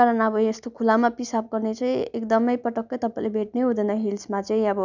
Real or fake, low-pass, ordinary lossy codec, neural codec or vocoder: real; 7.2 kHz; none; none